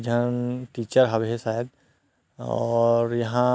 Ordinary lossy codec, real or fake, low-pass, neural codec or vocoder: none; real; none; none